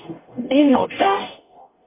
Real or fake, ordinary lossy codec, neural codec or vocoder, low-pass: fake; MP3, 24 kbps; codec, 44.1 kHz, 0.9 kbps, DAC; 3.6 kHz